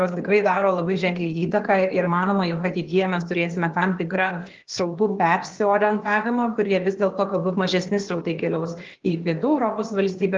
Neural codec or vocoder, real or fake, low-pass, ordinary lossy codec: codec, 16 kHz, 0.8 kbps, ZipCodec; fake; 7.2 kHz; Opus, 16 kbps